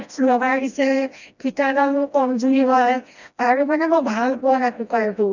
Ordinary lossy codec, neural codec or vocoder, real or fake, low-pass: none; codec, 16 kHz, 1 kbps, FreqCodec, smaller model; fake; 7.2 kHz